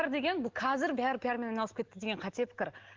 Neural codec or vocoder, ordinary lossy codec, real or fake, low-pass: none; Opus, 16 kbps; real; 7.2 kHz